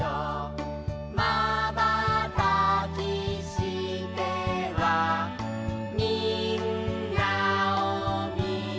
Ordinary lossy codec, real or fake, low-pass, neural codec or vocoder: none; real; none; none